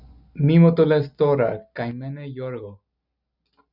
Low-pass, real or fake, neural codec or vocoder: 5.4 kHz; real; none